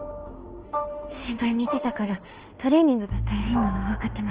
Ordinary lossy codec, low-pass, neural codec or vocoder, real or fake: Opus, 16 kbps; 3.6 kHz; autoencoder, 48 kHz, 32 numbers a frame, DAC-VAE, trained on Japanese speech; fake